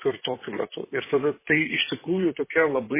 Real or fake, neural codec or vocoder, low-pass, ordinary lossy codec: fake; vocoder, 44.1 kHz, 128 mel bands, Pupu-Vocoder; 3.6 kHz; MP3, 16 kbps